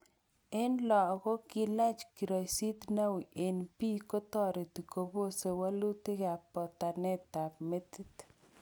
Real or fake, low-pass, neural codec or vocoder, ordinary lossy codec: real; none; none; none